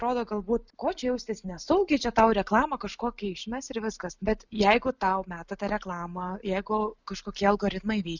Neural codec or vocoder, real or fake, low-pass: none; real; 7.2 kHz